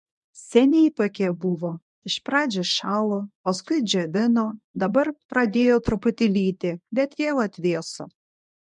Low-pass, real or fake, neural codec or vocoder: 10.8 kHz; fake; codec, 24 kHz, 0.9 kbps, WavTokenizer, medium speech release version 1